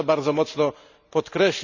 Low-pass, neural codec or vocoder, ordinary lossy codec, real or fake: 7.2 kHz; none; none; real